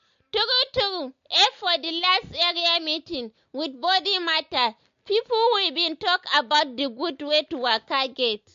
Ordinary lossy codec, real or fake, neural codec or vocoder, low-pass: MP3, 48 kbps; real; none; 7.2 kHz